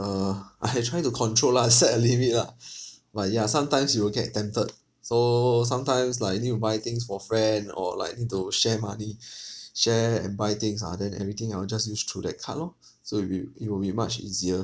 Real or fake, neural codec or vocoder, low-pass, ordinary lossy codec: real; none; none; none